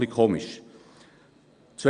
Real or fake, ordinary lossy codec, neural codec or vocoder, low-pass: real; Opus, 64 kbps; none; 9.9 kHz